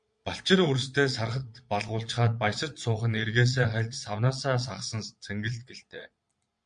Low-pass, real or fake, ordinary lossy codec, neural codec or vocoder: 9.9 kHz; fake; MP3, 64 kbps; vocoder, 22.05 kHz, 80 mel bands, Vocos